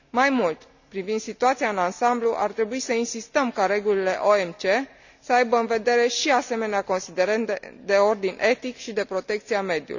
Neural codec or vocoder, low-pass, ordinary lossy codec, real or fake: none; 7.2 kHz; none; real